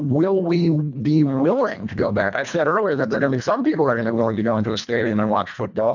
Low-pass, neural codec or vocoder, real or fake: 7.2 kHz; codec, 24 kHz, 1.5 kbps, HILCodec; fake